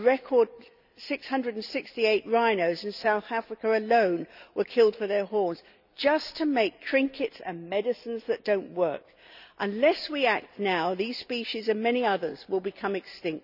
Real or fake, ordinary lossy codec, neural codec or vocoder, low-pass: real; none; none; 5.4 kHz